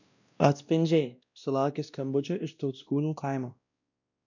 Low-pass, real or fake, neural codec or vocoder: 7.2 kHz; fake; codec, 16 kHz, 1 kbps, X-Codec, WavLM features, trained on Multilingual LibriSpeech